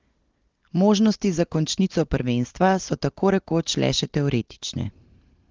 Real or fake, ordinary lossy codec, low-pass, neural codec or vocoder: real; Opus, 16 kbps; 7.2 kHz; none